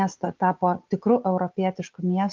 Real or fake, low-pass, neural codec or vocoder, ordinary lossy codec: real; 7.2 kHz; none; Opus, 24 kbps